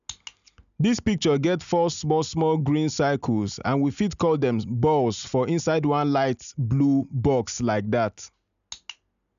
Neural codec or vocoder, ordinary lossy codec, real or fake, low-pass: none; none; real; 7.2 kHz